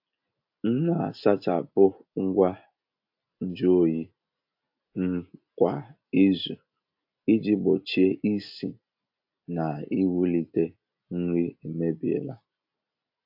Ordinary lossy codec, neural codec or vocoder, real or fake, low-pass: AAC, 48 kbps; none; real; 5.4 kHz